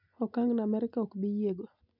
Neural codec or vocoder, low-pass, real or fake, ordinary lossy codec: none; 5.4 kHz; real; none